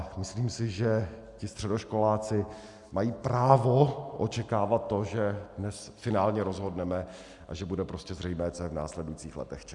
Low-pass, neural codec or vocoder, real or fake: 10.8 kHz; none; real